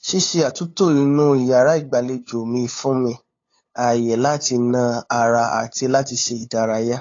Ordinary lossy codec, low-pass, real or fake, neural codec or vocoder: AAC, 32 kbps; 7.2 kHz; fake; codec, 16 kHz, 8 kbps, FunCodec, trained on LibriTTS, 25 frames a second